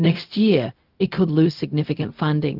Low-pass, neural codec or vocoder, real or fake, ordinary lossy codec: 5.4 kHz; codec, 16 kHz, 0.4 kbps, LongCat-Audio-Codec; fake; Opus, 32 kbps